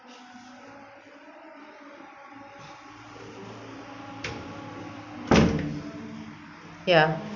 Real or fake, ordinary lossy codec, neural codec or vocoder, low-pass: real; none; none; 7.2 kHz